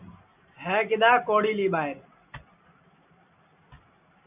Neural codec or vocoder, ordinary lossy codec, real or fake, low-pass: none; AAC, 32 kbps; real; 3.6 kHz